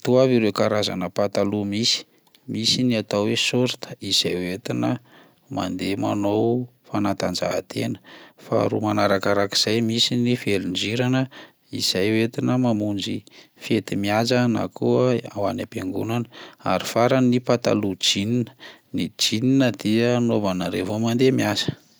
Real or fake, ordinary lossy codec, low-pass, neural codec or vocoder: real; none; none; none